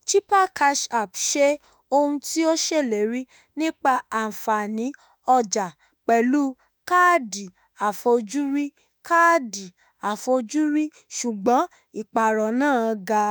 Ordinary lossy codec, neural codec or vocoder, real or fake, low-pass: none; autoencoder, 48 kHz, 32 numbers a frame, DAC-VAE, trained on Japanese speech; fake; none